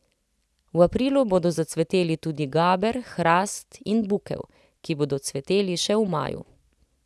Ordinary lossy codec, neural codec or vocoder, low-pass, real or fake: none; none; none; real